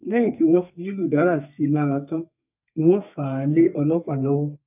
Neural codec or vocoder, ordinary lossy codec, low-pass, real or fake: codec, 32 kHz, 1.9 kbps, SNAC; none; 3.6 kHz; fake